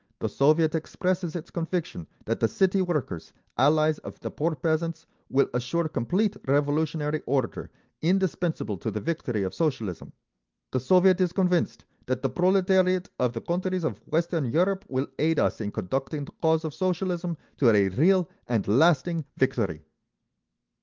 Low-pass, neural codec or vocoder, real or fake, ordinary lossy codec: 7.2 kHz; none; real; Opus, 24 kbps